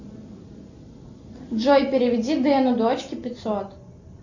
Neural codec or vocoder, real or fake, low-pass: none; real; 7.2 kHz